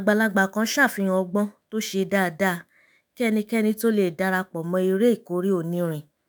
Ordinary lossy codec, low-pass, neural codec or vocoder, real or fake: none; none; autoencoder, 48 kHz, 128 numbers a frame, DAC-VAE, trained on Japanese speech; fake